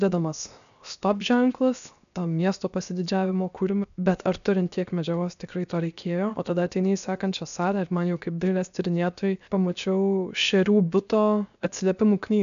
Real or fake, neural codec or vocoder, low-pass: fake; codec, 16 kHz, 0.7 kbps, FocalCodec; 7.2 kHz